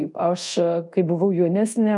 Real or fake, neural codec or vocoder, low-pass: fake; codec, 24 kHz, 0.5 kbps, DualCodec; 10.8 kHz